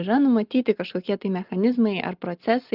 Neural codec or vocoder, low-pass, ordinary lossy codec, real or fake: none; 5.4 kHz; Opus, 32 kbps; real